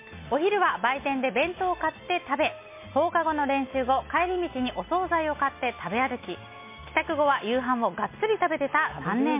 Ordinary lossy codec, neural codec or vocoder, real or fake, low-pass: MP3, 24 kbps; none; real; 3.6 kHz